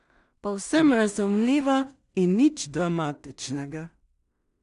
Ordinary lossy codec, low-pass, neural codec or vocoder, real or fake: none; 10.8 kHz; codec, 16 kHz in and 24 kHz out, 0.4 kbps, LongCat-Audio-Codec, two codebook decoder; fake